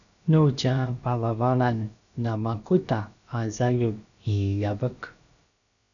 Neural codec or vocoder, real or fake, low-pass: codec, 16 kHz, about 1 kbps, DyCAST, with the encoder's durations; fake; 7.2 kHz